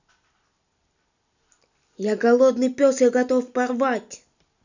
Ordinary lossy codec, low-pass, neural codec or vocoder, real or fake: none; 7.2 kHz; none; real